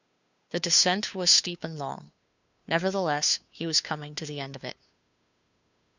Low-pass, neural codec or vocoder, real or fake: 7.2 kHz; codec, 16 kHz, 2 kbps, FunCodec, trained on Chinese and English, 25 frames a second; fake